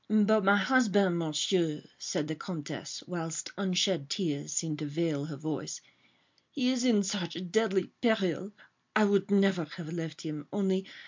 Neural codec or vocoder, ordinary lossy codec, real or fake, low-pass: none; MP3, 64 kbps; real; 7.2 kHz